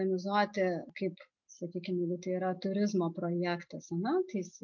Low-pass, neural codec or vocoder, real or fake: 7.2 kHz; none; real